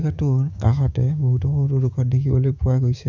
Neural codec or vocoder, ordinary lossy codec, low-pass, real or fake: codec, 16 kHz, 6 kbps, DAC; none; 7.2 kHz; fake